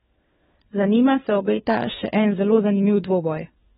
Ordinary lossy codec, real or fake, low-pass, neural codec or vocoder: AAC, 16 kbps; fake; 7.2 kHz; codec, 16 kHz, 4 kbps, FunCodec, trained on LibriTTS, 50 frames a second